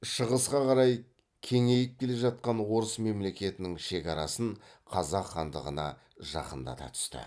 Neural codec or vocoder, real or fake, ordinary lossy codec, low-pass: none; real; none; none